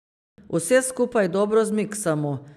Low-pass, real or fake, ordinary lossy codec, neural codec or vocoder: 14.4 kHz; real; none; none